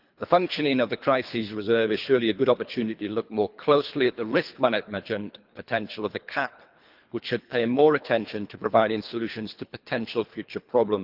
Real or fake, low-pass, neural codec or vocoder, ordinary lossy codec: fake; 5.4 kHz; codec, 24 kHz, 3 kbps, HILCodec; Opus, 24 kbps